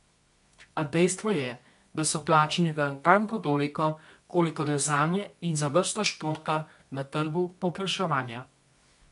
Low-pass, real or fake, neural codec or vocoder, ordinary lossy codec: 10.8 kHz; fake; codec, 24 kHz, 0.9 kbps, WavTokenizer, medium music audio release; MP3, 64 kbps